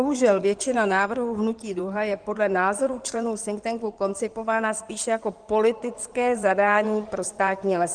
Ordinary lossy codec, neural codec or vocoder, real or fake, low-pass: Opus, 24 kbps; codec, 16 kHz in and 24 kHz out, 2.2 kbps, FireRedTTS-2 codec; fake; 9.9 kHz